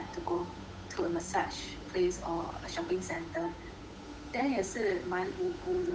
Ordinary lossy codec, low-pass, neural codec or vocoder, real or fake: none; none; codec, 16 kHz, 8 kbps, FunCodec, trained on Chinese and English, 25 frames a second; fake